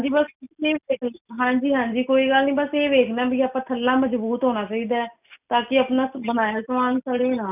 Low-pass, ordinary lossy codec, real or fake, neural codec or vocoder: 3.6 kHz; none; real; none